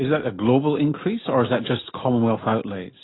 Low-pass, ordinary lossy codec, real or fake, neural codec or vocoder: 7.2 kHz; AAC, 16 kbps; real; none